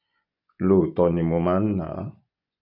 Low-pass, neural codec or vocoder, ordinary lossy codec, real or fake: 5.4 kHz; vocoder, 24 kHz, 100 mel bands, Vocos; none; fake